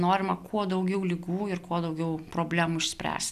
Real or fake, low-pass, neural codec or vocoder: real; 14.4 kHz; none